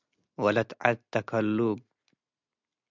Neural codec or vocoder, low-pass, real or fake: none; 7.2 kHz; real